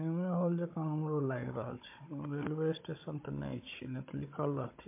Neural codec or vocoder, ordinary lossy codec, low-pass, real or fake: codec, 16 kHz, 16 kbps, FunCodec, trained on Chinese and English, 50 frames a second; MP3, 24 kbps; 3.6 kHz; fake